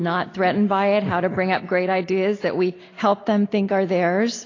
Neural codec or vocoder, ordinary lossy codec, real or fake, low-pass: none; AAC, 32 kbps; real; 7.2 kHz